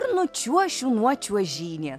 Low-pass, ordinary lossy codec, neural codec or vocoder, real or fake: 14.4 kHz; MP3, 96 kbps; none; real